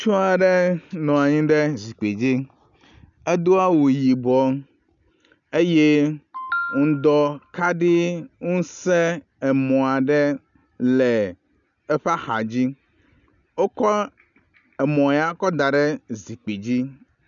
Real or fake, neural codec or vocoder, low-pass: real; none; 7.2 kHz